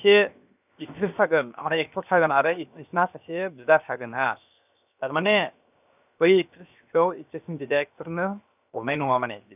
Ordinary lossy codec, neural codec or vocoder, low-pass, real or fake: none; codec, 16 kHz, 0.7 kbps, FocalCodec; 3.6 kHz; fake